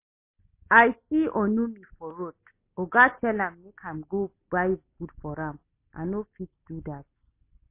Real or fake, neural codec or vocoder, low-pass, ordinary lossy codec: real; none; 3.6 kHz; MP3, 24 kbps